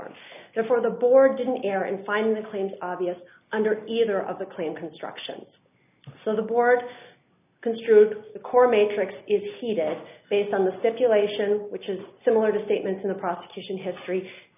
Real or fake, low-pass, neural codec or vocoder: real; 3.6 kHz; none